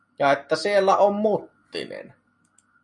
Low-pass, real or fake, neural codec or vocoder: 10.8 kHz; real; none